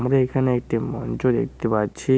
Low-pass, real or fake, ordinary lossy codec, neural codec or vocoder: none; real; none; none